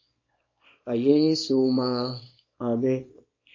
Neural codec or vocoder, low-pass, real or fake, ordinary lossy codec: codec, 24 kHz, 0.9 kbps, WavTokenizer, small release; 7.2 kHz; fake; MP3, 32 kbps